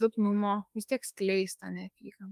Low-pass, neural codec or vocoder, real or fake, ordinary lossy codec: 14.4 kHz; autoencoder, 48 kHz, 32 numbers a frame, DAC-VAE, trained on Japanese speech; fake; Opus, 32 kbps